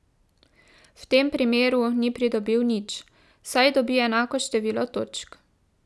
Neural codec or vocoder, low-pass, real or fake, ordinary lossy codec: none; none; real; none